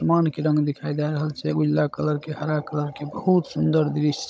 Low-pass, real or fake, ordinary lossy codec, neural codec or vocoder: none; fake; none; codec, 16 kHz, 16 kbps, FunCodec, trained on Chinese and English, 50 frames a second